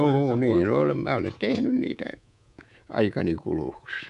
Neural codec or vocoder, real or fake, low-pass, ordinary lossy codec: codec, 24 kHz, 3.1 kbps, DualCodec; fake; 10.8 kHz; none